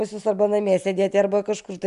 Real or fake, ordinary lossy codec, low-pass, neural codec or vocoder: real; AAC, 96 kbps; 10.8 kHz; none